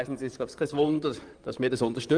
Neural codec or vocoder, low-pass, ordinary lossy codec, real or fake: vocoder, 44.1 kHz, 128 mel bands, Pupu-Vocoder; 9.9 kHz; Opus, 32 kbps; fake